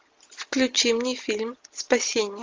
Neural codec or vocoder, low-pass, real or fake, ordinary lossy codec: none; 7.2 kHz; real; Opus, 32 kbps